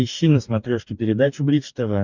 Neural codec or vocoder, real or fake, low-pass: codec, 44.1 kHz, 2.6 kbps, DAC; fake; 7.2 kHz